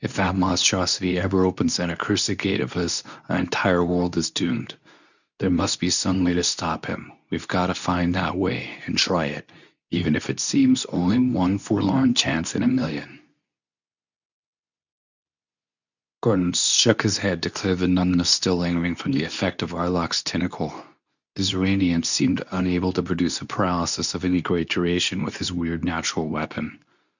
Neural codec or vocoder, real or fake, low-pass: codec, 24 kHz, 0.9 kbps, WavTokenizer, medium speech release version 2; fake; 7.2 kHz